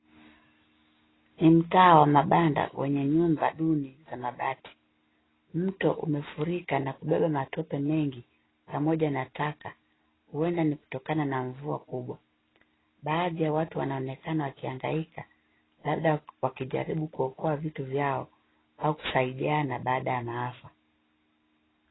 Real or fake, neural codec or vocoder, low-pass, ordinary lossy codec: real; none; 7.2 kHz; AAC, 16 kbps